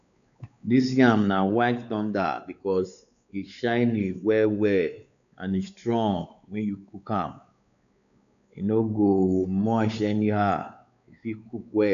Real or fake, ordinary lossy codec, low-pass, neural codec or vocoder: fake; none; 7.2 kHz; codec, 16 kHz, 4 kbps, X-Codec, WavLM features, trained on Multilingual LibriSpeech